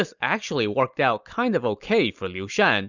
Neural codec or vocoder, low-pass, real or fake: none; 7.2 kHz; real